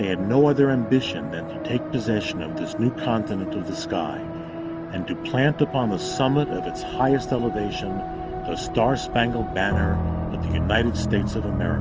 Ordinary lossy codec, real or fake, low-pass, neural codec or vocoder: Opus, 24 kbps; real; 7.2 kHz; none